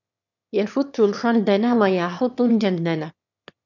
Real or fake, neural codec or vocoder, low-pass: fake; autoencoder, 22.05 kHz, a latent of 192 numbers a frame, VITS, trained on one speaker; 7.2 kHz